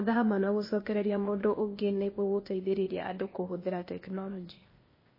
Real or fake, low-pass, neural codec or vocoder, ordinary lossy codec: fake; 5.4 kHz; codec, 16 kHz, 0.8 kbps, ZipCodec; MP3, 24 kbps